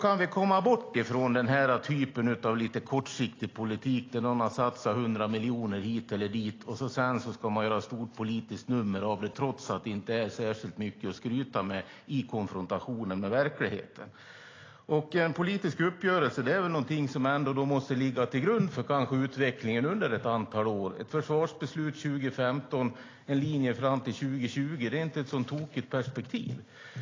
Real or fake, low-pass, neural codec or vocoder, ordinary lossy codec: real; 7.2 kHz; none; AAC, 32 kbps